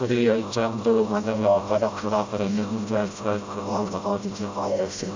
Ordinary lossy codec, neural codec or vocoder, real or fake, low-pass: MP3, 64 kbps; codec, 16 kHz, 0.5 kbps, FreqCodec, smaller model; fake; 7.2 kHz